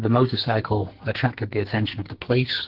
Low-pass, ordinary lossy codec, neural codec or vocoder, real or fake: 5.4 kHz; Opus, 16 kbps; codec, 44.1 kHz, 2.6 kbps, SNAC; fake